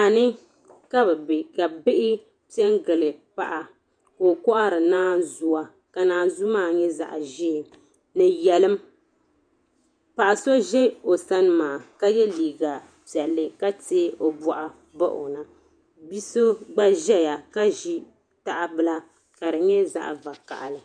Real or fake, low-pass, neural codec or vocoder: fake; 9.9 kHz; vocoder, 48 kHz, 128 mel bands, Vocos